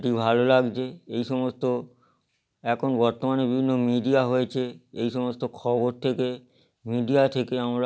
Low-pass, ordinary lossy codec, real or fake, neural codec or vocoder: none; none; real; none